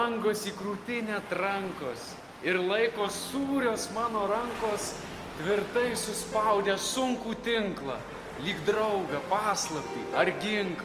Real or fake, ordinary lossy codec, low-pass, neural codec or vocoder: real; Opus, 32 kbps; 14.4 kHz; none